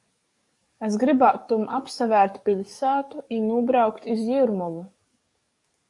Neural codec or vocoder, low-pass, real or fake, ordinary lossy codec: codec, 44.1 kHz, 7.8 kbps, DAC; 10.8 kHz; fake; AAC, 64 kbps